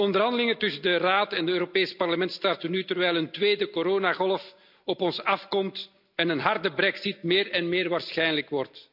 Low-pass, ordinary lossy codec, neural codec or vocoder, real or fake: 5.4 kHz; none; none; real